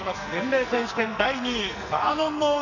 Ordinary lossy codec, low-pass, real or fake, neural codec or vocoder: none; 7.2 kHz; fake; codec, 44.1 kHz, 2.6 kbps, SNAC